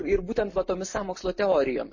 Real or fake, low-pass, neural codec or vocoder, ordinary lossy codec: real; 7.2 kHz; none; MP3, 32 kbps